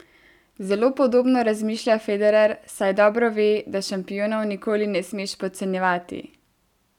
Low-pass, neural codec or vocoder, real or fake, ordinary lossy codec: 19.8 kHz; none; real; none